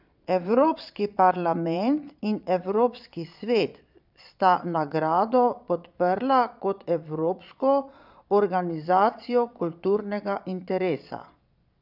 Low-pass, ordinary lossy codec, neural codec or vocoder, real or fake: 5.4 kHz; none; vocoder, 22.05 kHz, 80 mel bands, Vocos; fake